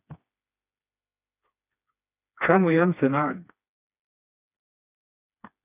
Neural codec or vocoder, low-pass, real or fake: codec, 16 kHz, 2 kbps, FreqCodec, smaller model; 3.6 kHz; fake